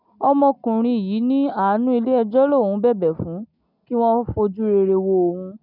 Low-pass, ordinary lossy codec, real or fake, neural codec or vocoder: 5.4 kHz; none; real; none